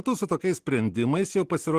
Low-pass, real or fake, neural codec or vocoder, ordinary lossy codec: 14.4 kHz; real; none; Opus, 16 kbps